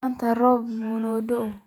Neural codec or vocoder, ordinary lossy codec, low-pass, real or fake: vocoder, 48 kHz, 128 mel bands, Vocos; none; 19.8 kHz; fake